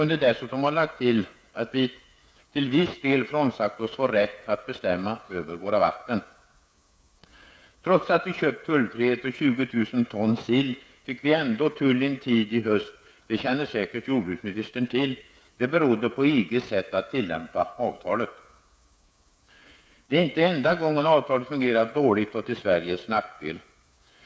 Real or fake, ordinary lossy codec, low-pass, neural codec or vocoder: fake; none; none; codec, 16 kHz, 8 kbps, FreqCodec, smaller model